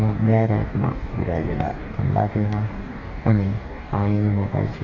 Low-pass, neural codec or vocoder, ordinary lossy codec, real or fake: 7.2 kHz; codec, 44.1 kHz, 2.6 kbps, DAC; none; fake